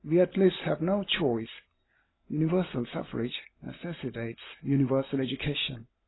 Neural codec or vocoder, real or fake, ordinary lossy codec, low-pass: none; real; AAC, 16 kbps; 7.2 kHz